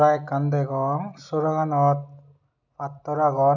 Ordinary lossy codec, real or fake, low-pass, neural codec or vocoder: none; real; 7.2 kHz; none